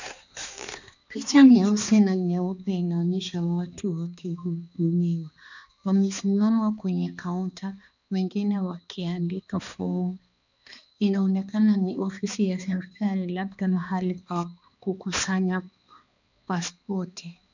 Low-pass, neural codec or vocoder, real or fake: 7.2 kHz; codec, 16 kHz, 2 kbps, X-Codec, HuBERT features, trained on balanced general audio; fake